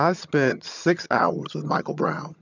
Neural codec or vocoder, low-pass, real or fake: vocoder, 22.05 kHz, 80 mel bands, HiFi-GAN; 7.2 kHz; fake